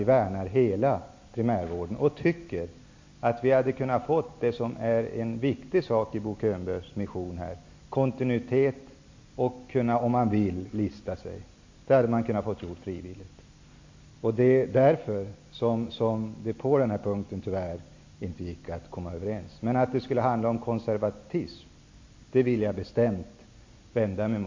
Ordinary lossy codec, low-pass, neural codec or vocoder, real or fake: MP3, 48 kbps; 7.2 kHz; none; real